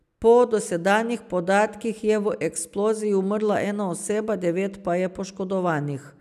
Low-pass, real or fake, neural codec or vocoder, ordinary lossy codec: 14.4 kHz; real; none; none